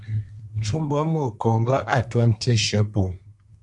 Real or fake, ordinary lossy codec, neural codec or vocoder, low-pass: fake; MP3, 96 kbps; codec, 24 kHz, 1 kbps, SNAC; 10.8 kHz